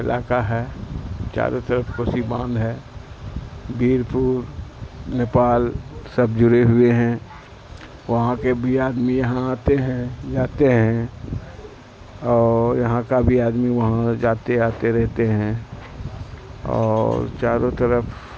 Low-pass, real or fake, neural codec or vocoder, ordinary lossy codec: none; real; none; none